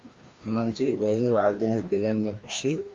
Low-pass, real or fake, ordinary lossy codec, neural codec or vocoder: 7.2 kHz; fake; Opus, 32 kbps; codec, 16 kHz, 1 kbps, FreqCodec, larger model